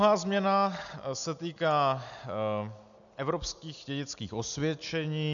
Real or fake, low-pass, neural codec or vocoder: real; 7.2 kHz; none